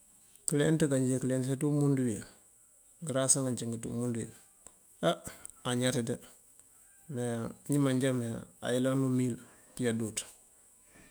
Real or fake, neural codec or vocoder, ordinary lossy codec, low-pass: fake; autoencoder, 48 kHz, 128 numbers a frame, DAC-VAE, trained on Japanese speech; none; none